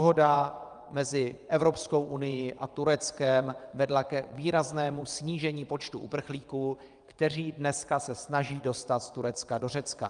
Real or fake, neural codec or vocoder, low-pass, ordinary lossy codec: fake; vocoder, 22.05 kHz, 80 mel bands, WaveNeXt; 9.9 kHz; Opus, 32 kbps